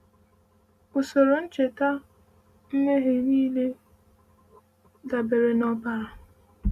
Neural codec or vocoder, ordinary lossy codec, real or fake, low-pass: none; Opus, 64 kbps; real; 14.4 kHz